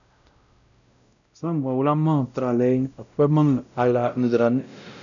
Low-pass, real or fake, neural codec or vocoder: 7.2 kHz; fake; codec, 16 kHz, 0.5 kbps, X-Codec, WavLM features, trained on Multilingual LibriSpeech